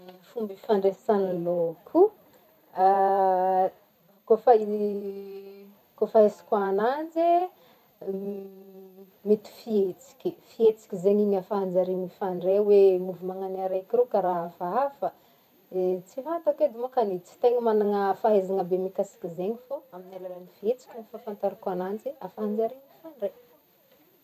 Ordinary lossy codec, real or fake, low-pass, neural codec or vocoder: none; fake; 14.4 kHz; vocoder, 44.1 kHz, 128 mel bands every 512 samples, BigVGAN v2